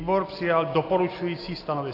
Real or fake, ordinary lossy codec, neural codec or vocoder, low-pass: real; AAC, 32 kbps; none; 5.4 kHz